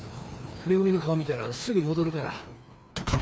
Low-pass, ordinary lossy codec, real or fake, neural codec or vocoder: none; none; fake; codec, 16 kHz, 2 kbps, FreqCodec, larger model